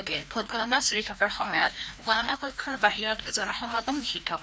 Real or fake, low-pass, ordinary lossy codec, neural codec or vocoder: fake; none; none; codec, 16 kHz, 1 kbps, FreqCodec, larger model